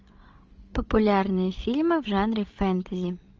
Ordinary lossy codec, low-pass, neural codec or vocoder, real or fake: Opus, 24 kbps; 7.2 kHz; codec, 16 kHz, 16 kbps, FreqCodec, larger model; fake